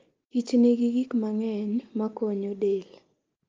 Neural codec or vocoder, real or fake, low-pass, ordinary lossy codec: none; real; 7.2 kHz; Opus, 24 kbps